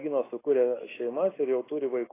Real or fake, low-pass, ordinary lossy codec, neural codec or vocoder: real; 3.6 kHz; AAC, 16 kbps; none